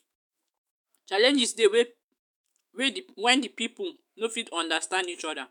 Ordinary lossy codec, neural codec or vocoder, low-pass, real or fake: none; autoencoder, 48 kHz, 128 numbers a frame, DAC-VAE, trained on Japanese speech; none; fake